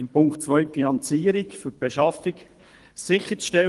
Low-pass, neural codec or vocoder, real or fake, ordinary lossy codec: 10.8 kHz; codec, 24 kHz, 3 kbps, HILCodec; fake; Opus, 24 kbps